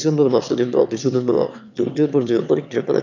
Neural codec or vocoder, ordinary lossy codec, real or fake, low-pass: autoencoder, 22.05 kHz, a latent of 192 numbers a frame, VITS, trained on one speaker; none; fake; 7.2 kHz